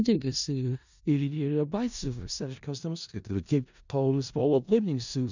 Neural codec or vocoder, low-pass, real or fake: codec, 16 kHz in and 24 kHz out, 0.4 kbps, LongCat-Audio-Codec, four codebook decoder; 7.2 kHz; fake